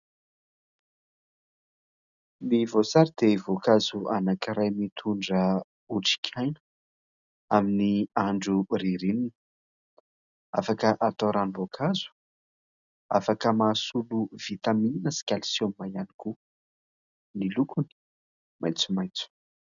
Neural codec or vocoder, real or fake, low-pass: none; real; 7.2 kHz